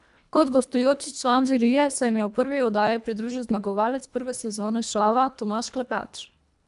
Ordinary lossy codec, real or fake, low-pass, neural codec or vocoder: none; fake; 10.8 kHz; codec, 24 kHz, 1.5 kbps, HILCodec